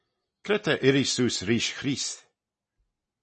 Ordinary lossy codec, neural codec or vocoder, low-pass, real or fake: MP3, 32 kbps; none; 10.8 kHz; real